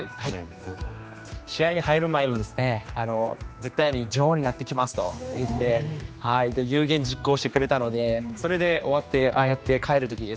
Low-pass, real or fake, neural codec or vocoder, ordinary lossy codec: none; fake; codec, 16 kHz, 2 kbps, X-Codec, HuBERT features, trained on general audio; none